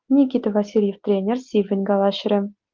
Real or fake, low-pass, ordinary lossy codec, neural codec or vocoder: real; 7.2 kHz; Opus, 32 kbps; none